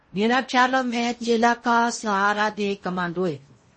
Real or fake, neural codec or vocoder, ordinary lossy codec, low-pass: fake; codec, 16 kHz in and 24 kHz out, 0.8 kbps, FocalCodec, streaming, 65536 codes; MP3, 32 kbps; 10.8 kHz